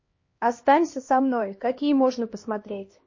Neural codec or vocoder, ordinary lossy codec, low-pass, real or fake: codec, 16 kHz, 1 kbps, X-Codec, HuBERT features, trained on LibriSpeech; MP3, 32 kbps; 7.2 kHz; fake